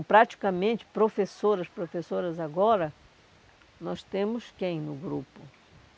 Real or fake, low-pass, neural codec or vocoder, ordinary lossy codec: real; none; none; none